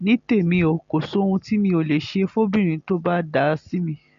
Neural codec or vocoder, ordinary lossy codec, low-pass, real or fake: none; MP3, 48 kbps; 7.2 kHz; real